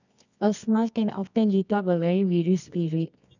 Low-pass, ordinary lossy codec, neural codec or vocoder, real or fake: 7.2 kHz; none; codec, 24 kHz, 0.9 kbps, WavTokenizer, medium music audio release; fake